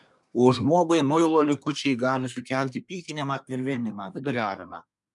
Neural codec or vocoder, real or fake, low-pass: codec, 24 kHz, 1 kbps, SNAC; fake; 10.8 kHz